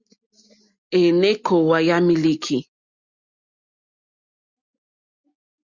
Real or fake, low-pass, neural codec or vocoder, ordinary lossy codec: real; 7.2 kHz; none; Opus, 64 kbps